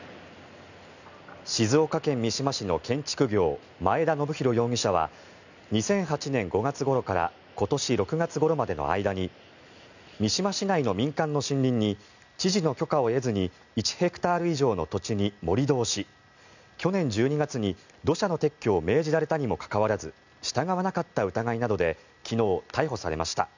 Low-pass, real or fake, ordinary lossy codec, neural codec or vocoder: 7.2 kHz; real; none; none